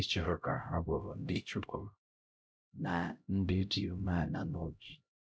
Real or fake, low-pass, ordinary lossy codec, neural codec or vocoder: fake; none; none; codec, 16 kHz, 0.5 kbps, X-Codec, HuBERT features, trained on LibriSpeech